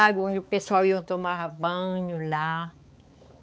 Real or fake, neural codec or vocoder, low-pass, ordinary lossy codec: fake; codec, 16 kHz, 4 kbps, X-Codec, HuBERT features, trained on balanced general audio; none; none